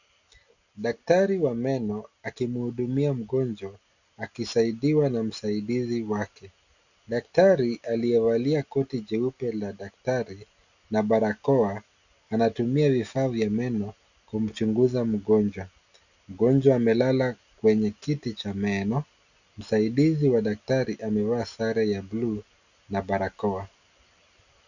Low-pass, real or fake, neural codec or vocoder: 7.2 kHz; real; none